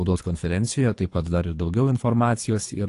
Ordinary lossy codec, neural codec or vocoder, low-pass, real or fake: AAC, 48 kbps; codec, 24 kHz, 3 kbps, HILCodec; 10.8 kHz; fake